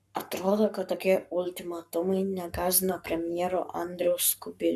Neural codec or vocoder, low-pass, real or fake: codec, 44.1 kHz, 7.8 kbps, Pupu-Codec; 14.4 kHz; fake